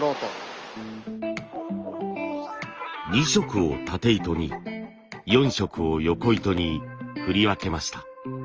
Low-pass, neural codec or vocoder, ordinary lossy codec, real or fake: 7.2 kHz; none; Opus, 24 kbps; real